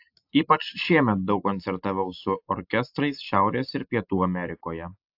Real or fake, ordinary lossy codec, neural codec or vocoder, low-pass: real; AAC, 48 kbps; none; 5.4 kHz